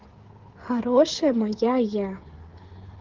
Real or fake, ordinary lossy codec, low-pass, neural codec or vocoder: fake; Opus, 16 kbps; 7.2 kHz; codec, 16 kHz, 8 kbps, FreqCodec, smaller model